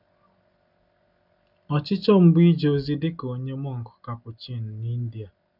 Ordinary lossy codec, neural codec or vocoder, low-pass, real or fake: none; none; 5.4 kHz; real